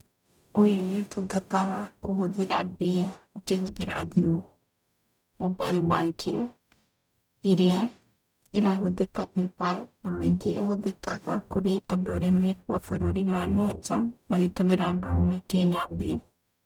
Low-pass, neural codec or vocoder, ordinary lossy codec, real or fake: 19.8 kHz; codec, 44.1 kHz, 0.9 kbps, DAC; none; fake